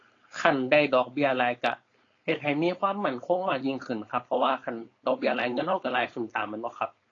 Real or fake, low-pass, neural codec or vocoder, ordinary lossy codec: fake; 7.2 kHz; codec, 16 kHz, 4.8 kbps, FACodec; AAC, 32 kbps